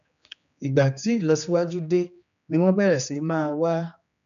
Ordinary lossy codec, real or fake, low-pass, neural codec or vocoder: none; fake; 7.2 kHz; codec, 16 kHz, 2 kbps, X-Codec, HuBERT features, trained on general audio